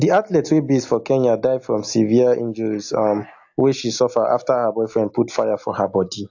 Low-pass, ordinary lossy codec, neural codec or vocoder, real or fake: 7.2 kHz; AAC, 48 kbps; none; real